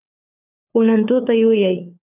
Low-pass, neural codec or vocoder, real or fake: 3.6 kHz; codec, 16 kHz, 4 kbps, FunCodec, trained on LibriTTS, 50 frames a second; fake